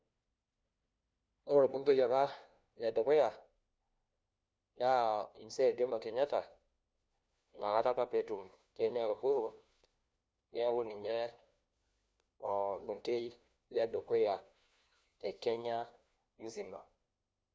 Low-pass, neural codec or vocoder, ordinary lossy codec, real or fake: none; codec, 16 kHz, 1 kbps, FunCodec, trained on LibriTTS, 50 frames a second; none; fake